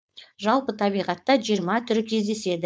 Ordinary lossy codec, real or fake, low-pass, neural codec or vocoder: none; fake; none; codec, 16 kHz, 4.8 kbps, FACodec